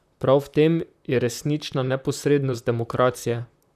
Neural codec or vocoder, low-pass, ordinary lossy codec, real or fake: vocoder, 44.1 kHz, 128 mel bands, Pupu-Vocoder; 14.4 kHz; none; fake